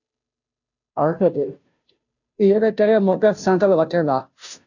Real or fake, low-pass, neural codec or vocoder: fake; 7.2 kHz; codec, 16 kHz, 0.5 kbps, FunCodec, trained on Chinese and English, 25 frames a second